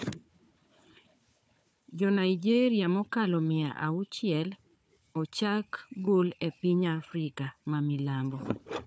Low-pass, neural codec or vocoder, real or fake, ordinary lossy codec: none; codec, 16 kHz, 4 kbps, FunCodec, trained on Chinese and English, 50 frames a second; fake; none